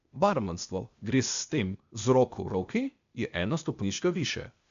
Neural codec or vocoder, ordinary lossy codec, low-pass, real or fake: codec, 16 kHz, 0.8 kbps, ZipCodec; MP3, 64 kbps; 7.2 kHz; fake